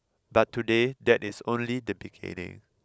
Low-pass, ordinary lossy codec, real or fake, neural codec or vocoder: none; none; real; none